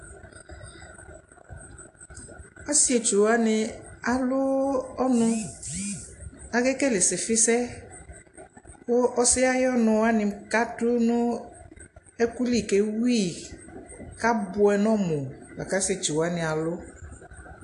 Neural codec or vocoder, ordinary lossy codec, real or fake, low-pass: none; AAC, 48 kbps; real; 10.8 kHz